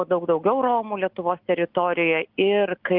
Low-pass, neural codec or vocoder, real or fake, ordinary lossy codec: 5.4 kHz; none; real; Opus, 24 kbps